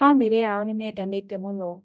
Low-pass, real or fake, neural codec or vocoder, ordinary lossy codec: none; fake; codec, 16 kHz, 0.5 kbps, X-Codec, HuBERT features, trained on general audio; none